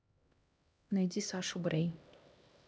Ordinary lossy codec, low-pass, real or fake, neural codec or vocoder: none; none; fake; codec, 16 kHz, 1 kbps, X-Codec, HuBERT features, trained on LibriSpeech